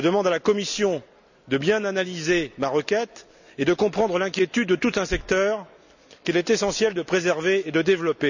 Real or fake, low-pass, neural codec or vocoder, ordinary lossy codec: real; 7.2 kHz; none; none